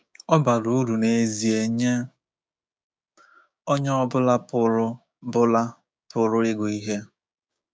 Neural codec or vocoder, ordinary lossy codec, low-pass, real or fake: codec, 16 kHz, 6 kbps, DAC; none; none; fake